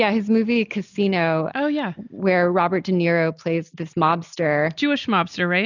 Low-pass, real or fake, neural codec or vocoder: 7.2 kHz; real; none